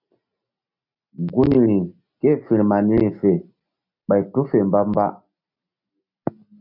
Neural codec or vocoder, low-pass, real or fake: none; 5.4 kHz; real